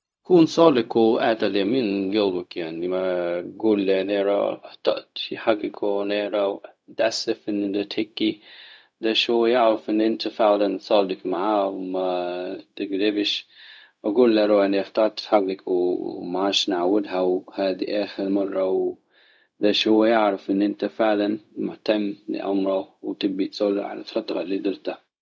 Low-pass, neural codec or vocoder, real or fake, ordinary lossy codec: none; codec, 16 kHz, 0.4 kbps, LongCat-Audio-Codec; fake; none